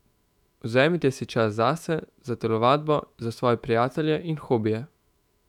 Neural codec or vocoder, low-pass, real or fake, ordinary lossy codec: autoencoder, 48 kHz, 128 numbers a frame, DAC-VAE, trained on Japanese speech; 19.8 kHz; fake; none